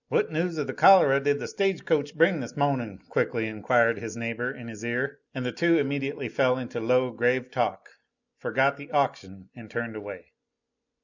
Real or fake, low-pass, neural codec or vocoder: real; 7.2 kHz; none